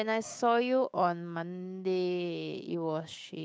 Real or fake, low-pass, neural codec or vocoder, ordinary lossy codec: fake; none; codec, 16 kHz, 6 kbps, DAC; none